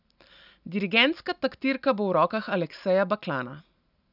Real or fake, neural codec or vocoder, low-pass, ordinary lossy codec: real; none; 5.4 kHz; none